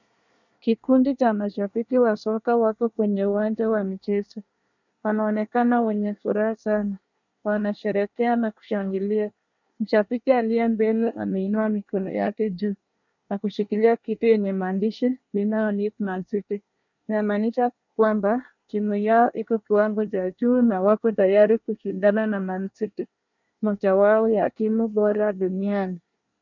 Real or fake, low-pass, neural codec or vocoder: fake; 7.2 kHz; codec, 24 kHz, 1 kbps, SNAC